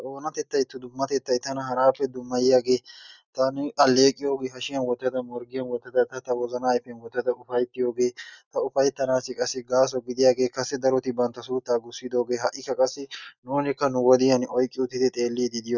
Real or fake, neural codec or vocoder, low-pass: real; none; 7.2 kHz